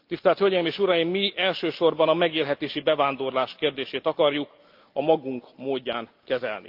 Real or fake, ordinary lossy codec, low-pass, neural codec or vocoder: real; Opus, 32 kbps; 5.4 kHz; none